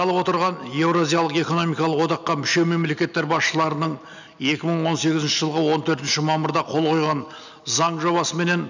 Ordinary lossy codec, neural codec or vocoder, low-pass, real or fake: none; none; 7.2 kHz; real